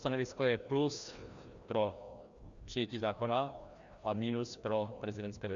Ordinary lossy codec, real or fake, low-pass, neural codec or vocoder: Opus, 64 kbps; fake; 7.2 kHz; codec, 16 kHz, 1 kbps, FreqCodec, larger model